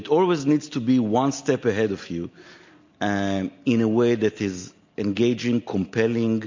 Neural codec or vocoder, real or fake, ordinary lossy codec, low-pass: none; real; MP3, 48 kbps; 7.2 kHz